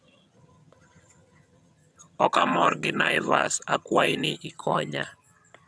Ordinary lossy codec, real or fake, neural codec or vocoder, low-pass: none; fake; vocoder, 22.05 kHz, 80 mel bands, HiFi-GAN; none